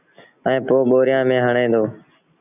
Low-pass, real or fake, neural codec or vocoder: 3.6 kHz; real; none